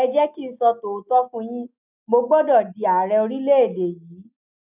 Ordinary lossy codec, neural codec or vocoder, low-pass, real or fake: none; none; 3.6 kHz; real